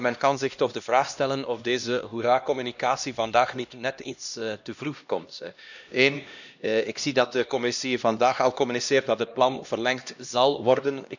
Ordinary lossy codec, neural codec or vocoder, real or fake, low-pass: none; codec, 16 kHz, 1 kbps, X-Codec, HuBERT features, trained on LibriSpeech; fake; 7.2 kHz